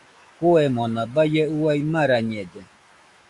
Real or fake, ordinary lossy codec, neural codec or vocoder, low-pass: fake; Opus, 64 kbps; autoencoder, 48 kHz, 128 numbers a frame, DAC-VAE, trained on Japanese speech; 10.8 kHz